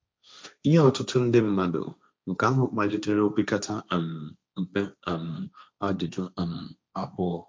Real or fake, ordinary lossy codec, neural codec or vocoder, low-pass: fake; none; codec, 16 kHz, 1.1 kbps, Voila-Tokenizer; none